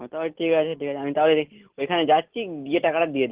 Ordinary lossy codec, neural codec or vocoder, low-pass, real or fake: Opus, 16 kbps; none; 3.6 kHz; real